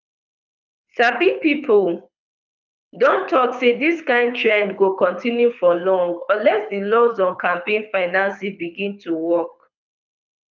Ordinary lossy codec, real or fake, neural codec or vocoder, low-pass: none; fake; codec, 24 kHz, 6 kbps, HILCodec; 7.2 kHz